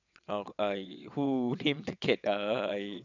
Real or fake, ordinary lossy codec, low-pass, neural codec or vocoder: fake; none; 7.2 kHz; vocoder, 22.05 kHz, 80 mel bands, WaveNeXt